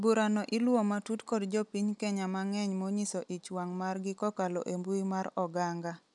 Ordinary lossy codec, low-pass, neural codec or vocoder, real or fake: none; 10.8 kHz; none; real